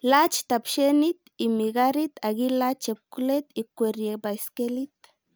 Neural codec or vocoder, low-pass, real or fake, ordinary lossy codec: none; none; real; none